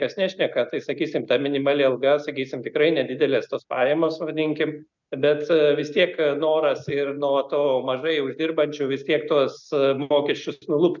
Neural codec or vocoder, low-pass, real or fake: none; 7.2 kHz; real